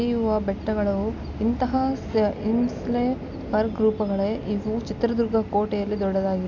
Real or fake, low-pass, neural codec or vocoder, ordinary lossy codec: real; 7.2 kHz; none; none